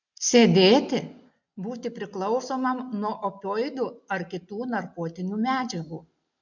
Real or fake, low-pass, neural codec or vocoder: real; 7.2 kHz; none